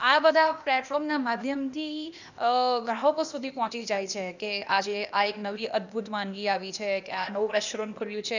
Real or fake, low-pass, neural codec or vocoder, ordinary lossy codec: fake; 7.2 kHz; codec, 16 kHz, 0.8 kbps, ZipCodec; none